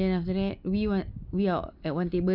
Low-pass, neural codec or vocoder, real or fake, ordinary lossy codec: 5.4 kHz; none; real; none